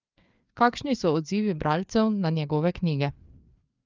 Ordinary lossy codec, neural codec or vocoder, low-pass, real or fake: Opus, 24 kbps; codec, 16 kHz, 4 kbps, FreqCodec, larger model; 7.2 kHz; fake